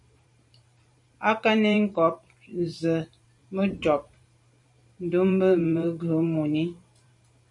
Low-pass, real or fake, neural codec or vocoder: 10.8 kHz; fake; vocoder, 44.1 kHz, 128 mel bands every 512 samples, BigVGAN v2